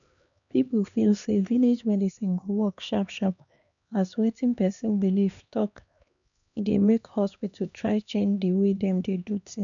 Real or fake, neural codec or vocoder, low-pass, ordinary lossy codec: fake; codec, 16 kHz, 2 kbps, X-Codec, HuBERT features, trained on LibriSpeech; 7.2 kHz; none